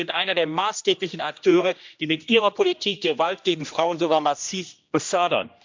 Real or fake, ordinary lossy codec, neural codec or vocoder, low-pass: fake; MP3, 64 kbps; codec, 16 kHz, 1 kbps, X-Codec, HuBERT features, trained on general audio; 7.2 kHz